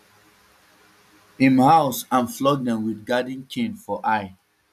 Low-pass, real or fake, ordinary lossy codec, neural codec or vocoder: 14.4 kHz; real; none; none